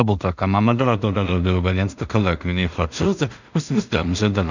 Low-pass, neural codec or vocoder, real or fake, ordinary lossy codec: 7.2 kHz; codec, 16 kHz in and 24 kHz out, 0.4 kbps, LongCat-Audio-Codec, two codebook decoder; fake; none